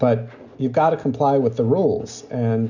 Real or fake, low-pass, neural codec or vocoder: real; 7.2 kHz; none